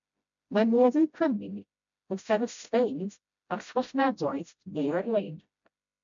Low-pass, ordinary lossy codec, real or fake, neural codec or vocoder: 7.2 kHz; MP3, 64 kbps; fake; codec, 16 kHz, 0.5 kbps, FreqCodec, smaller model